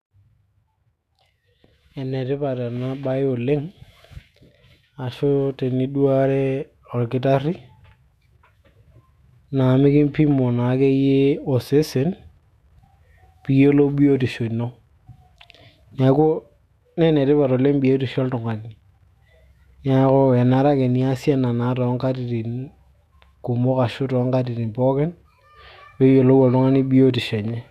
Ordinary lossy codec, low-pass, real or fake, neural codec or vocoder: none; 14.4 kHz; fake; autoencoder, 48 kHz, 128 numbers a frame, DAC-VAE, trained on Japanese speech